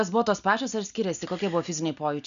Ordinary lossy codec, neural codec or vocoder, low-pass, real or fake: AAC, 96 kbps; none; 7.2 kHz; real